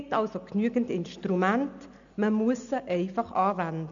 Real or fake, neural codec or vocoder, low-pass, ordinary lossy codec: real; none; 7.2 kHz; none